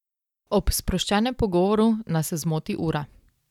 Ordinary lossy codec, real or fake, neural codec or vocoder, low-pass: none; real; none; 19.8 kHz